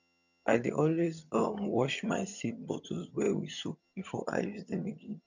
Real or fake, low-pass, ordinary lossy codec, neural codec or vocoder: fake; 7.2 kHz; none; vocoder, 22.05 kHz, 80 mel bands, HiFi-GAN